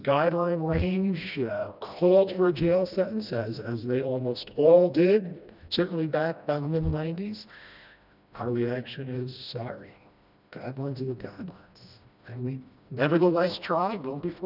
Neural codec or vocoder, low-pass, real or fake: codec, 16 kHz, 1 kbps, FreqCodec, smaller model; 5.4 kHz; fake